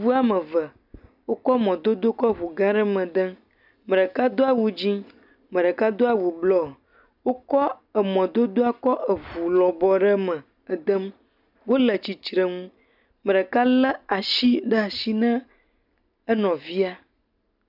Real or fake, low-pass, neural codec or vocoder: real; 5.4 kHz; none